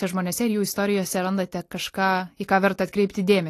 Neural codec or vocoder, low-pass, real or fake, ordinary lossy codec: none; 14.4 kHz; real; AAC, 48 kbps